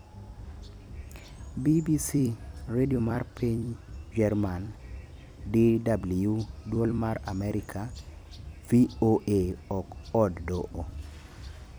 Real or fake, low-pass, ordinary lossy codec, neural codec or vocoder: fake; none; none; vocoder, 44.1 kHz, 128 mel bands every 256 samples, BigVGAN v2